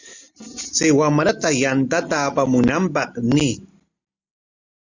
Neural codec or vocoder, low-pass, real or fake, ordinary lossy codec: none; 7.2 kHz; real; Opus, 64 kbps